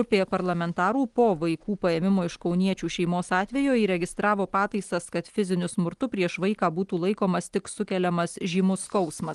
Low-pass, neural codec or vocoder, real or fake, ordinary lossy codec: 10.8 kHz; none; real; Opus, 24 kbps